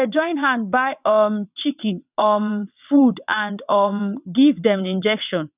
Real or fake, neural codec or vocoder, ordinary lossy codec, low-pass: fake; vocoder, 22.05 kHz, 80 mel bands, WaveNeXt; none; 3.6 kHz